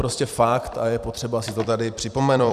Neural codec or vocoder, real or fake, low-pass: vocoder, 44.1 kHz, 128 mel bands every 256 samples, BigVGAN v2; fake; 14.4 kHz